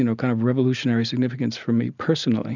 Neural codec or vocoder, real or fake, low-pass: none; real; 7.2 kHz